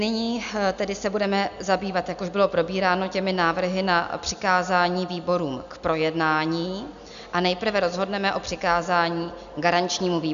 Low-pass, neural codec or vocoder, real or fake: 7.2 kHz; none; real